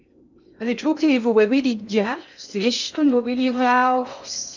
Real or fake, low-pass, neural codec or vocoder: fake; 7.2 kHz; codec, 16 kHz in and 24 kHz out, 0.6 kbps, FocalCodec, streaming, 2048 codes